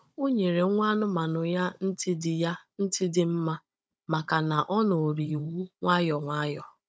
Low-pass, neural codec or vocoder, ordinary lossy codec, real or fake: none; codec, 16 kHz, 16 kbps, FunCodec, trained on Chinese and English, 50 frames a second; none; fake